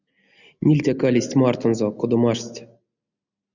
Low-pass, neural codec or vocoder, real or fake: 7.2 kHz; none; real